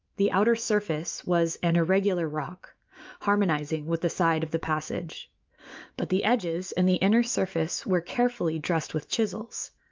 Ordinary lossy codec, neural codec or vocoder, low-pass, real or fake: Opus, 24 kbps; autoencoder, 48 kHz, 128 numbers a frame, DAC-VAE, trained on Japanese speech; 7.2 kHz; fake